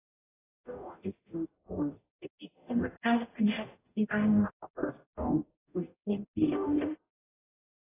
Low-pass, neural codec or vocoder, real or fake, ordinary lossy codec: 3.6 kHz; codec, 44.1 kHz, 0.9 kbps, DAC; fake; AAC, 16 kbps